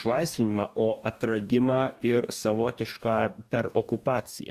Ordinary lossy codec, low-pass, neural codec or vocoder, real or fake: Opus, 64 kbps; 14.4 kHz; codec, 44.1 kHz, 2.6 kbps, DAC; fake